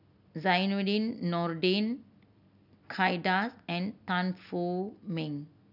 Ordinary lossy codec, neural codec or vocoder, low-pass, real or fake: none; none; 5.4 kHz; real